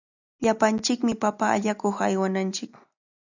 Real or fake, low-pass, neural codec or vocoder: real; 7.2 kHz; none